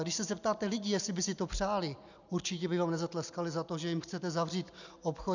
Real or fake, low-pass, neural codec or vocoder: real; 7.2 kHz; none